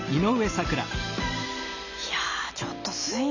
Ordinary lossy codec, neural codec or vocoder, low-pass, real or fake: none; none; 7.2 kHz; real